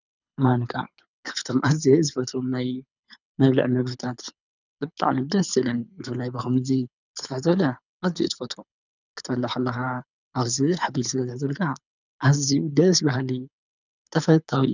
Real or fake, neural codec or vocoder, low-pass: fake; codec, 24 kHz, 6 kbps, HILCodec; 7.2 kHz